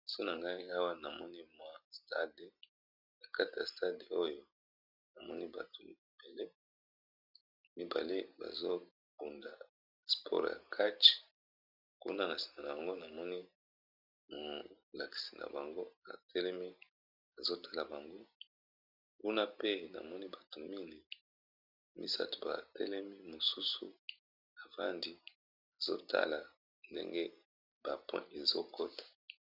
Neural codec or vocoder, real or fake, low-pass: none; real; 5.4 kHz